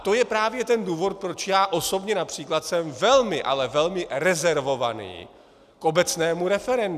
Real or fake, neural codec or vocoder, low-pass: real; none; 14.4 kHz